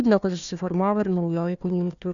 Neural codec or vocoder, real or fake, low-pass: codec, 16 kHz, 1 kbps, FunCodec, trained on Chinese and English, 50 frames a second; fake; 7.2 kHz